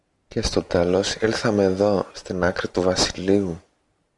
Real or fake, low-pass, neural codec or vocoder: real; 10.8 kHz; none